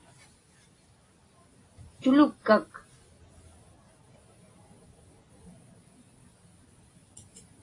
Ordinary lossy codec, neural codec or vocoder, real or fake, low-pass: AAC, 32 kbps; none; real; 10.8 kHz